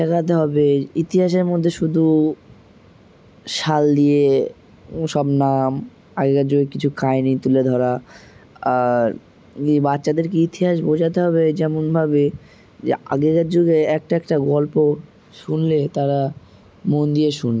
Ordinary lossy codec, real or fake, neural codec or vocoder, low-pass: none; real; none; none